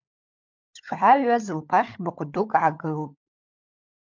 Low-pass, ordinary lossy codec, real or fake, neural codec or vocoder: 7.2 kHz; MP3, 64 kbps; fake; codec, 16 kHz, 16 kbps, FunCodec, trained on LibriTTS, 50 frames a second